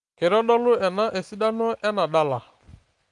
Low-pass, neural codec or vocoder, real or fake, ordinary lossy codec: 10.8 kHz; none; real; Opus, 32 kbps